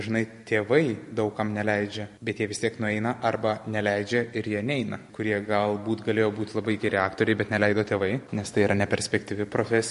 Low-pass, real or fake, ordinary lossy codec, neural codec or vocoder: 14.4 kHz; fake; MP3, 48 kbps; vocoder, 44.1 kHz, 128 mel bands every 512 samples, BigVGAN v2